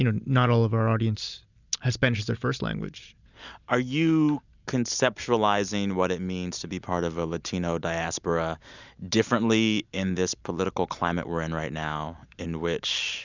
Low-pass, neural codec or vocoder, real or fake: 7.2 kHz; none; real